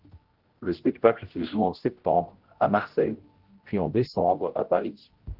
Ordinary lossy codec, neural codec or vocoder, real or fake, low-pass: Opus, 16 kbps; codec, 16 kHz, 0.5 kbps, X-Codec, HuBERT features, trained on general audio; fake; 5.4 kHz